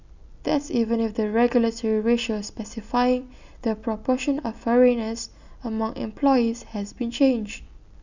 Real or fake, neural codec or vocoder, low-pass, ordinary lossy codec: real; none; 7.2 kHz; none